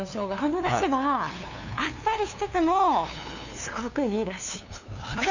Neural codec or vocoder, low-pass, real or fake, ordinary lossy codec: codec, 16 kHz, 2 kbps, FunCodec, trained on LibriTTS, 25 frames a second; 7.2 kHz; fake; none